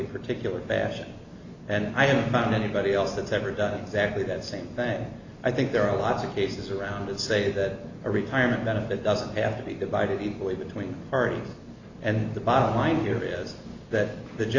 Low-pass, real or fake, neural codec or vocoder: 7.2 kHz; real; none